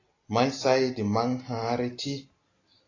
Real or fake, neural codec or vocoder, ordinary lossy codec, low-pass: real; none; AAC, 32 kbps; 7.2 kHz